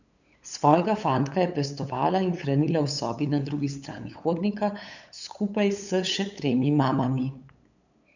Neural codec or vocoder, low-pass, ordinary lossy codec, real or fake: codec, 16 kHz, 8 kbps, FunCodec, trained on LibriTTS, 25 frames a second; 7.2 kHz; none; fake